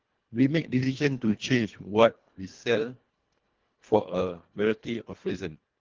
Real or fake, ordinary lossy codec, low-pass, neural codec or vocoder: fake; Opus, 16 kbps; 7.2 kHz; codec, 24 kHz, 1.5 kbps, HILCodec